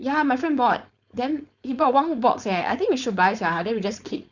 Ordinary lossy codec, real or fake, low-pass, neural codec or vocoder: Opus, 64 kbps; fake; 7.2 kHz; codec, 16 kHz, 4.8 kbps, FACodec